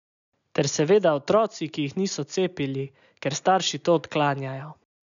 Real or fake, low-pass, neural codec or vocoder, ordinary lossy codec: real; 7.2 kHz; none; none